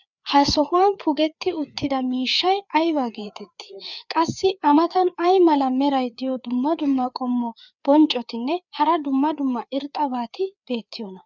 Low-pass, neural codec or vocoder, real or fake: 7.2 kHz; codec, 16 kHz, 4 kbps, FreqCodec, larger model; fake